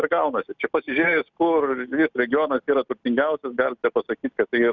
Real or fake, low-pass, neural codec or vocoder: real; 7.2 kHz; none